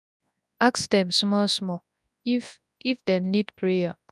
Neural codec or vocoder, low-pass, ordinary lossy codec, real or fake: codec, 24 kHz, 0.9 kbps, WavTokenizer, large speech release; none; none; fake